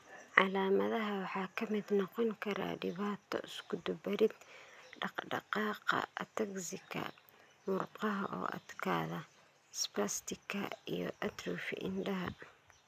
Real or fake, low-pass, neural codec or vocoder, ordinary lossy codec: real; 14.4 kHz; none; none